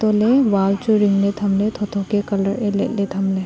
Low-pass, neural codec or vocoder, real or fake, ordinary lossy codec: none; none; real; none